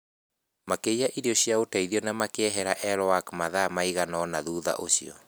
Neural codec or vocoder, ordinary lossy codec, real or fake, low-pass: none; none; real; none